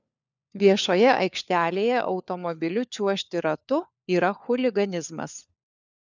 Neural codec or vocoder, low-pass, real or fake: codec, 16 kHz, 4 kbps, FunCodec, trained on LibriTTS, 50 frames a second; 7.2 kHz; fake